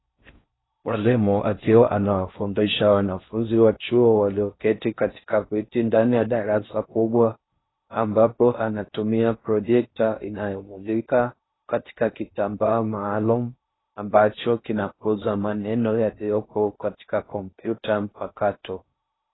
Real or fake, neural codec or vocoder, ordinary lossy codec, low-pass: fake; codec, 16 kHz in and 24 kHz out, 0.6 kbps, FocalCodec, streaming, 4096 codes; AAC, 16 kbps; 7.2 kHz